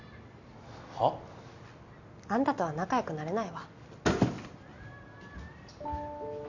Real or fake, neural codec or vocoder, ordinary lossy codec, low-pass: real; none; none; 7.2 kHz